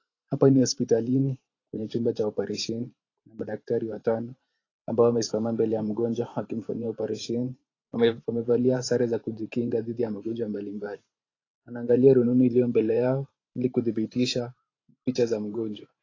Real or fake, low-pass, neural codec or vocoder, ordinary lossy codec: fake; 7.2 kHz; vocoder, 44.1 kHz, 128 mel bands every 512 samples, BigVGAN v2; AAC, 32 kbps